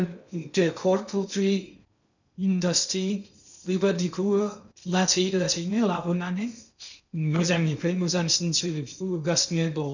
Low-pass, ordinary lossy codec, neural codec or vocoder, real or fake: 7.2 kHz; none; codec, 16 kHz in and 24 kHz out, 0.6 kbps, FocalCodec, streaming, 2048 codes; fake